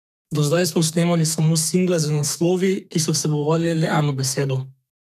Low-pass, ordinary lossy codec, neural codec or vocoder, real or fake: 14.4 kHz; none; codec, 32 kHz, 1.9 kbps, SNAC; fake